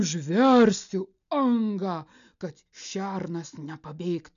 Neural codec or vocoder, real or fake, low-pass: none; real; 7.2 kHz